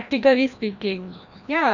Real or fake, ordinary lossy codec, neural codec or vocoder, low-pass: fake; none; codec, 16 kHz, 1 kbps, FreqCodec, larger model; 7.2 kHz